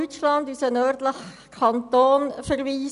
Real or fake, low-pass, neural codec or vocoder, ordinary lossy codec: real; 10.8 kHz; none; none